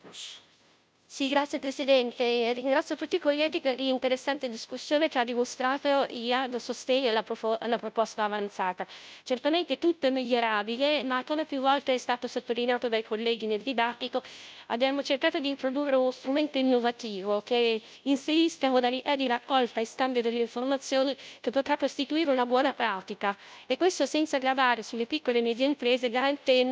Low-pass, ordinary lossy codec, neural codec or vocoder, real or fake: none; none; codec, 16 kHz, 0.5 kbps, FunCodec, trained on Chinese and English, 25 frames a second; fake